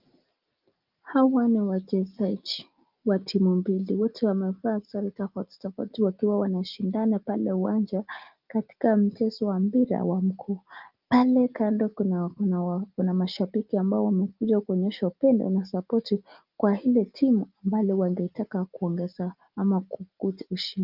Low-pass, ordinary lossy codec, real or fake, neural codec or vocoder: 5.4 kHz; Opus, 24 kbps; real; none